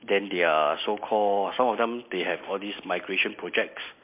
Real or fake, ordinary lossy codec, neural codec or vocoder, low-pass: real; MP3, 32 kbps; none; 3.6 kHz